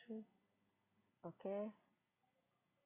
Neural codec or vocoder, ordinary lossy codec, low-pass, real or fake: none; Opus, 64 kbps; 3.6 kHz; real